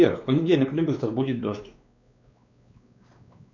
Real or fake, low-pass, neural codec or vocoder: fake; 7.2 kHz; codec, 16 kHz, 2 kbps, X-Codec, WavLM features, trained on Multilingual LibriSpeech